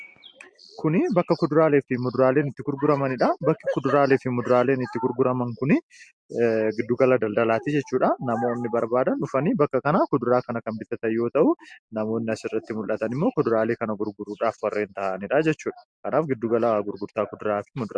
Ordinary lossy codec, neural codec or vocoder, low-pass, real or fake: MP3, 64 kbps; none; 9.9 kHz; real